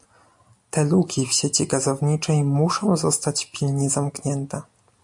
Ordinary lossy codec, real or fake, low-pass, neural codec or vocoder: MP3, 64 kbps; real; 10.8 kHz; none